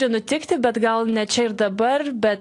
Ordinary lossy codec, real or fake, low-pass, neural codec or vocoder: AAC, 48 kbps; real; 10.8 kHz; none